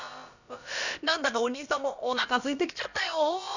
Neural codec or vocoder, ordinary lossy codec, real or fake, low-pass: codec, 16 kHz, about 1 kbps, DyCAST, with the encoder's durations; none; fake; 7.2 kHz